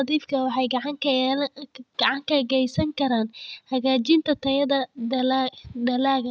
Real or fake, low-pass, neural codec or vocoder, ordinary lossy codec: real; none; none; none